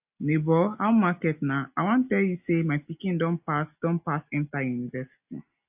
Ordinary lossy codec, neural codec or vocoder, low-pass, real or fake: AAC, 32 kbps; none; 3.6 kHz; real